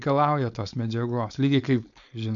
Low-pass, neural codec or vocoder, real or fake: 7.2 kHz; codec, 16 kHz, 4.8 kbps, FACodec; fake